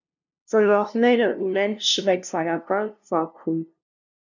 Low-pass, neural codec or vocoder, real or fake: 7.2 kHz; codec, 16 kHz, 0.5 kbps, FunCodec, trained on LibriTTS, 25 frames a second; fake